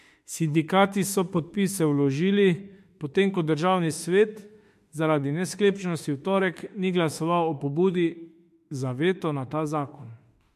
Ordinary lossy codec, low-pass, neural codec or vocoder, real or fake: MP3, 64 kbps; 14.4 kHz; autoencoder, 48 kHz, 32 numbers a frame, DAC-VAE, trained on Japanese speech; fake